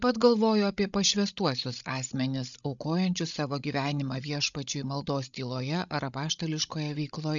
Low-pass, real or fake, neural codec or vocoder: 7.2 kHz; fake; codec, 16 kHz, 16 kbps, FreqCodec, larger model